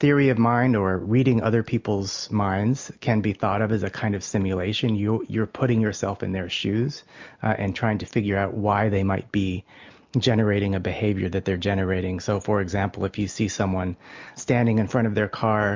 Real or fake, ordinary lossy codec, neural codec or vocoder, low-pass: real; MP3, 64 kbps; none; 7.2 kHz